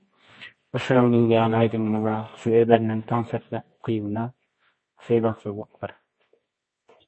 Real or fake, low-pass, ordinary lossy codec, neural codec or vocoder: fake; 9.9 kHz; MP3, 32 kbps; codec, 24 kHz, 0.9 kbps, WavTokenizer, medium music audio release